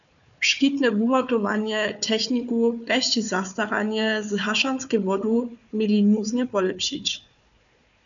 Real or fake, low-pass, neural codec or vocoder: fake; 7.2 kHz; codec, 16 kHz, 4 kbps, FunCodec, trained on Chinese and English, 50 frames a second